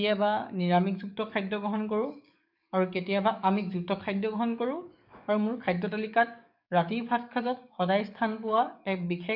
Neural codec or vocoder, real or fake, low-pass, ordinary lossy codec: codec, 44.1 kHz, 7.8 kbps, DAC; fake; 5.4 kHz; none